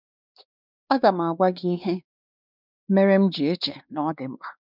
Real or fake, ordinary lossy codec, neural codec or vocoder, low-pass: fake; none; codec, 16 kHz, 2 kbps, X-Codec, WavLM features, trained on Multilingual LibriSpeech; 5.4 kHz